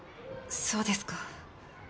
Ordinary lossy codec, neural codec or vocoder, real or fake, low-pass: none; none; real; none